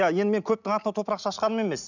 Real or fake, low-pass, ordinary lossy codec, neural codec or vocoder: real; 7.2 kHz; none; none